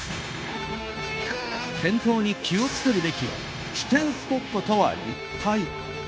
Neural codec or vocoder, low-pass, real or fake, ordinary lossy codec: codec, 16 kHz, 0.9 kbps, LongCat-Audio-Codec; none; fake; none